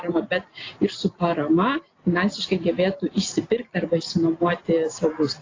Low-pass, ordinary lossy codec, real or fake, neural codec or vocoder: 7.2 kHz; AAC, 32 kbps; real; none